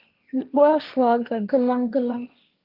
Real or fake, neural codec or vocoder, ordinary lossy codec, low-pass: fake; codec, 16 kHz, 1.1 kbps, Voila-Tokenizer; Opus, 24 kbps; 5.4 kHz